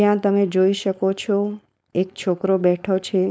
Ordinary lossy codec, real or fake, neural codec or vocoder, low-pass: none; fake; codec, 16 kHz, 4.8 kbps, FACodec; none